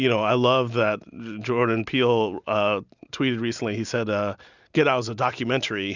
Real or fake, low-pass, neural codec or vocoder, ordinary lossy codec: real; 7.2 kHz; none; Opus, 64 kbps